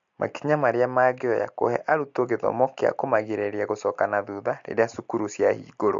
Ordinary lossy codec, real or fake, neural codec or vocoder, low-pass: MP3, 64 kbps; real; none; 7.2 kHz